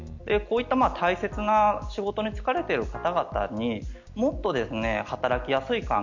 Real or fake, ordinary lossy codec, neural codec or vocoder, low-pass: real; none; none; 7.2 kHz